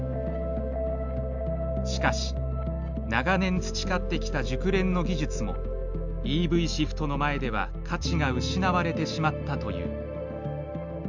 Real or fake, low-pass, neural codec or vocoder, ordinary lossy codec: real; 7.2 kHz; none; none